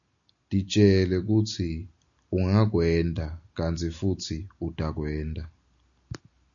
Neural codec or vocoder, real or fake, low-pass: none; real; 7.2 kHz